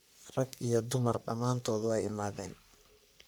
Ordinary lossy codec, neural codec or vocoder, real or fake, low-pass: none; codec, 44.1 kHz, 3.4 kbps, Pupu-Codec; fake; none